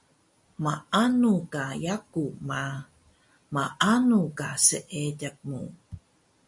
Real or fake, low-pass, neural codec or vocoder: real; 10.8 kHz; none